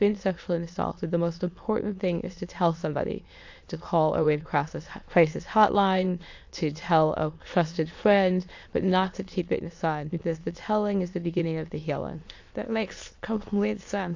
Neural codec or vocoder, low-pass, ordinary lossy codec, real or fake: autoencoder, 22.05 kHz, a latent of 192 numbers a frame, VITS, trained on many speakers; 7.2 kHz; AAC, 48 kbps; fake